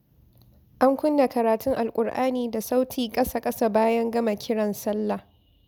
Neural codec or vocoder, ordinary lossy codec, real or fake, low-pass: none; none; real; none